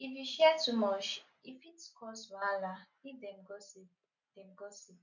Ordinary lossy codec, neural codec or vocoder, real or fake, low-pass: none; none; real; 7.2 kHz